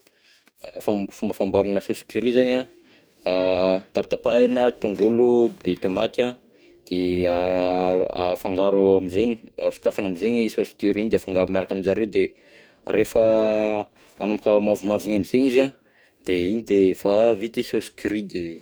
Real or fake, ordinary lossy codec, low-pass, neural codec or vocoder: fake; none; none; codec, 44.1 kHz, 2.6 kbps, DAC